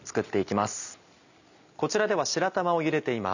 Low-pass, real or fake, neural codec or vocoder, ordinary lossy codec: 7.2 kHz; real; none; none